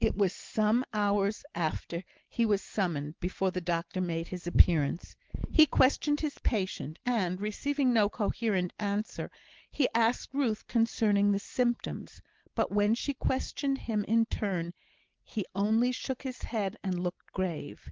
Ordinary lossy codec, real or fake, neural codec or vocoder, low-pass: Opus, 16 kbps; real; none; 7.2 kHz